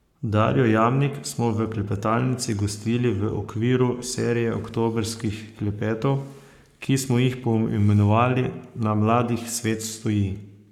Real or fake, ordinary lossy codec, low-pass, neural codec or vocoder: fake; none; 19.8 kHz; codec, 44.1 kHz, 7.8 kbps, Pupu-Codec